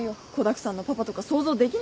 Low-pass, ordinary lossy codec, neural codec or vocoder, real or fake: none; none; none; real